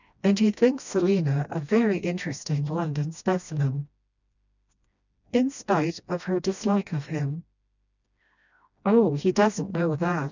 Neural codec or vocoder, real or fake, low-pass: codec, 16 kHz, 1 kbps, FreqCodec, smaller model; fake; 7.2 kHz